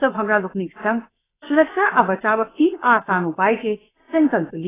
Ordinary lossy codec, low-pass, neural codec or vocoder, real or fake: AAC, 16 kbps; 3.6 kHz; codec, 16 kHz, about 1 kbps, DyCAST, with the encoder's durations; fake